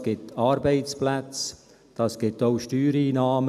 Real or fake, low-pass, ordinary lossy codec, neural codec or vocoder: real; 14.4 kHz; none; none